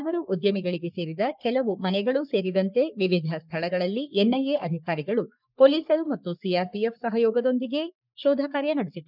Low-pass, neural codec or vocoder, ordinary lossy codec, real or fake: 5.4 kHz; codec, 44.1 kHz, 3.4 kbps, Pupu-Codec; none; fake